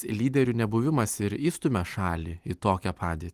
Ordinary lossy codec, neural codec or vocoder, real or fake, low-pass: Opus, 32 kbps; none; real; 14.4 kHz